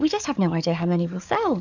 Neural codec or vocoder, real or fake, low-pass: codec, 44.1 kHz, 7.8 kbps, DAC; fake; 7.2 kHz